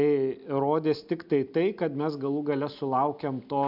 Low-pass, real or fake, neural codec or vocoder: 5.4 kHz; real; none